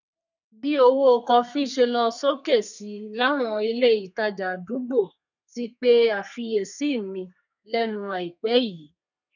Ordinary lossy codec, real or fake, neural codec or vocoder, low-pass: none; fake; codec, 32 kHz, 1.9 kbps, SNAC; 7.2 kHz